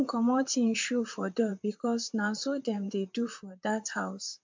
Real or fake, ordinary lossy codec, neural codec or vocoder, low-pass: fake; MP3, 64 kbps; vocoder, 44.1 kHz, 128 mel bands, Pupu-Vocoder; 7.2 kHz